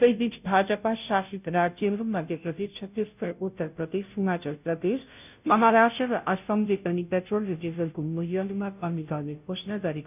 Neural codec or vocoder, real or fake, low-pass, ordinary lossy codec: codec, 16 kHz, 0.5 kbps, FunCodec, trained on Chinese and English, 25 frames a second; fake; 3.6 kHz; none